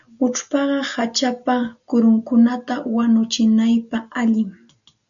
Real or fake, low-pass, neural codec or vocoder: real; 7.2 kHz; none